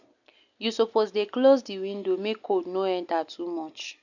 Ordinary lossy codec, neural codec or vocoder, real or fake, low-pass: none; none; real; 7.2 kHz